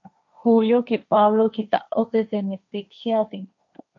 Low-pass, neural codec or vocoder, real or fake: 7.2 kHz; codec, 16 kHz, 1.1 kbps, Voila-Tokenizer; fake